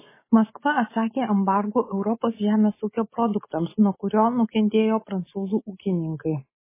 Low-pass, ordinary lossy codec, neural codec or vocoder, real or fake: 3.6 kHz; MP3, 16 kbps; none; real